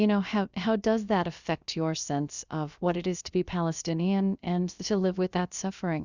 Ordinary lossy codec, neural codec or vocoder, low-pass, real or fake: Opus, 64 kbps; codec, 16 kHz, about 1 kbps, DyCAST, with the encoder's durations; 7.2 kHz; fake